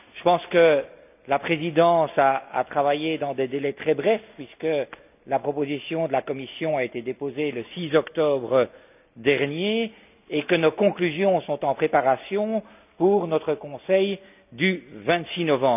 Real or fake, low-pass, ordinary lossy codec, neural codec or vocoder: real; 3.6 kHz; none; none